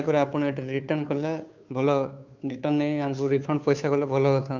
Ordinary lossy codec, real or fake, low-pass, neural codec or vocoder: MP3, 64 kbps; fake; 7.2 kHz; codec, 16 kHz, 2 kbps, FunCodec, trained on Chinese and English, 25 frames a second